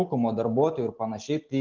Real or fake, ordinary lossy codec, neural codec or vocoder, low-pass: real; Opus, 32 kbps; none; 7.2 kHz